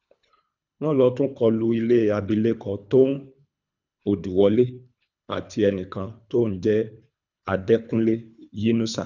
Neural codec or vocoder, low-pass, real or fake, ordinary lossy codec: codec, 24 kHz, 3 kbps, HILCodec; 7.2 kHz; fake; none